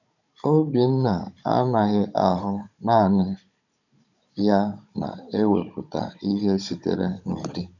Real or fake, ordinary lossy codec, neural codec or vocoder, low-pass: fake; none; codec, 16 kHz, 16 kbps, FunCodec, trained on Chinese and English, 50 frames a second; 7.2 kHz